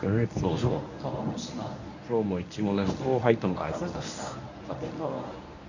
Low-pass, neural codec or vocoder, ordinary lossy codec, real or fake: 7.2 kHz; codec, 24 kHz, 0.9 kbps, WavTokenizer, medium speech release version 1; none; fake